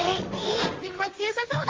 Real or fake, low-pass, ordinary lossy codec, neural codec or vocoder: fake; 7.2 kHz; Opus, 32 kbps; codec, 16 kHz, 1.1 kbps, Voila-Tokenizer